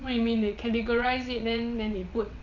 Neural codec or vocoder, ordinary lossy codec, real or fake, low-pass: vocoder, 22.05 kHz, 80 mel bands, WaveNeXt; none; fake; 7.2 kHz